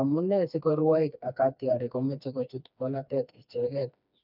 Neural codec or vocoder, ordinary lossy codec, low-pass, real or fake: codec, 16 kHz, 2 kbps, FreqCodec, smaller model; none; 5.4 kHz; fake